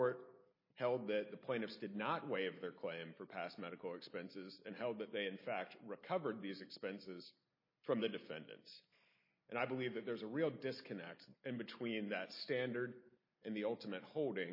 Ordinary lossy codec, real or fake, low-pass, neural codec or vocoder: MP3, 24 kbps; real; 5.4 kHz; none